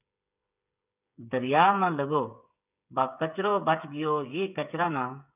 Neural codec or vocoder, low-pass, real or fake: codec, 16 kHz, 8 kbps, FreqCodec, smaller model; 3.6 kHz; fake